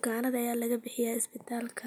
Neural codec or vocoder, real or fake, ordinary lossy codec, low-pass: none; real; none; none